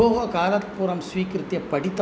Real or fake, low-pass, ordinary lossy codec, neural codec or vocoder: real; none; none; none